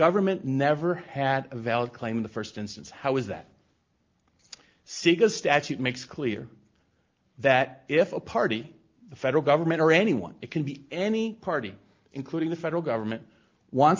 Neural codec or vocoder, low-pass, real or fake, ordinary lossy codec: none; 7.2 kHz; real; Opus, 24 kbps